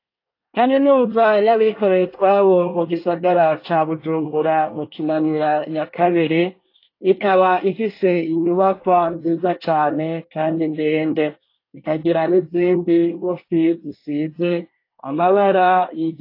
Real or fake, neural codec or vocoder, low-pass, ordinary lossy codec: fake; codec, 24 kHz, 1 kbps, SNAC; 5.4 kHz; AAC, 32 kbps